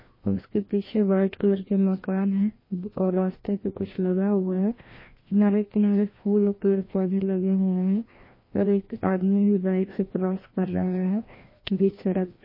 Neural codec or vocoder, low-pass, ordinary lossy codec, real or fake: codec, 16 kHz, 1 kbps, FreqCodec, larger model; 5.4 kHz; MP3, 24 kbps; fake